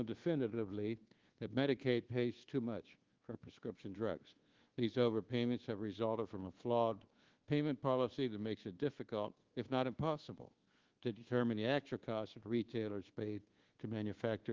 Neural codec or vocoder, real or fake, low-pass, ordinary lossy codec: codec, 24 kHz, 1.2 kbps, DualCodec; fake; 7.2 kHz; Opus, 16 kbps